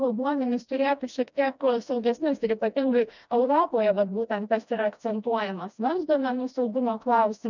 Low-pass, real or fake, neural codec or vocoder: 7.2 kHz; fake; codec, 16 kHz, 1 kbps, FreqCodec, smaller model